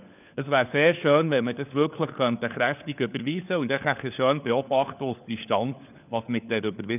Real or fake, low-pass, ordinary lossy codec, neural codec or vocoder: fake; 3.6 kHz; none; codec, 16 kHz, 4 kbps, FunCodec, trained on LibriTTS, 50 frames a second